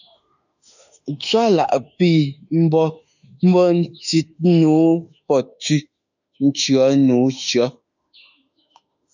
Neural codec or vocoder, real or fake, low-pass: autoencoder, 48 kHz, 32 numbers a frame, DAC-VAE, trained on Japanese speech; fake; 7.2 kHz